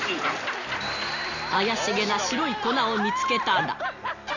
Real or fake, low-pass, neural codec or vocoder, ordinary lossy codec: real; 7.2 kHz; none; none